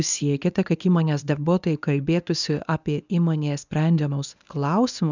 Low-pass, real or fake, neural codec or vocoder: 7.2 kHz; fake; codec, 24 kHz, 0.9 kbps, WavTokenizer, medium speech release version 1